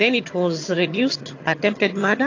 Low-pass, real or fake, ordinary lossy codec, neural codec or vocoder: 7.2 kHz; fake; AAC, 48 kbps; vocoder, 22.05 kHz, 80 mel bands, HiFi-GAN